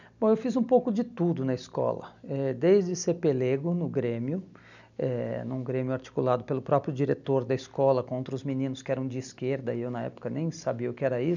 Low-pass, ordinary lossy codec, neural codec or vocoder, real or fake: 7.2 kHz; none; none; real